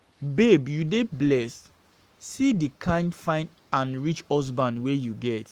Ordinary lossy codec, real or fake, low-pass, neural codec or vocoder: Opus, 32 kbps; fake; 19.8 kHz; codec, 44.1 kHz, 7.8 kbps, Pupu-Codec